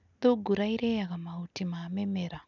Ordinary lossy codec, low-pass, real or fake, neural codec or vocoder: none; 7.2 kHz; real; none